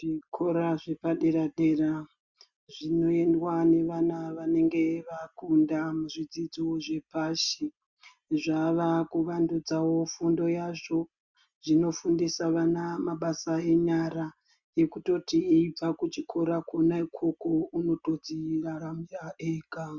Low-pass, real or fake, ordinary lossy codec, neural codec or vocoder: 7.2 kHz; real; Opus, 64 kbps; none